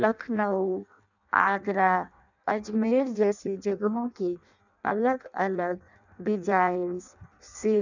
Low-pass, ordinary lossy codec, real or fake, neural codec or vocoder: 7.2 kHz; none; fake; codec, 16 kHz in and 24 kHz out, 0.6 kbps, FireRedTTS-2 codec